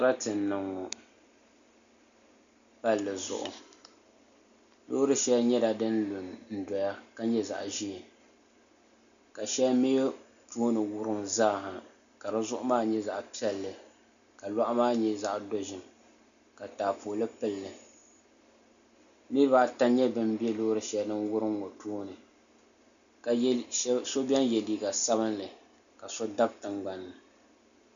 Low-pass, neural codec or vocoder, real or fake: 7.2 kHz; none; real